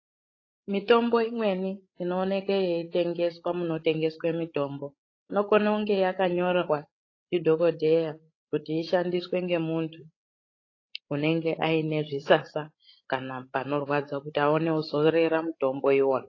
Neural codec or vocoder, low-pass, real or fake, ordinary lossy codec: codec, 16 kHz, 16 kbps, FreqCodec, larger model; 7.2 kHz; fake; AAC, 32 kbps